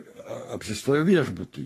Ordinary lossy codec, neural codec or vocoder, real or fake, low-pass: AAC, 48 kbps; codec, 44.1 kHz, 3.4 kbps, Pupu-Codec; fake; 14.4 kHz